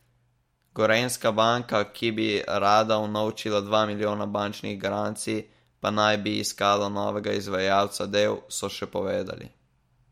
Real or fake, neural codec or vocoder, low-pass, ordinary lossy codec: real; none; 19.8 kHz; MP3, 64 kbps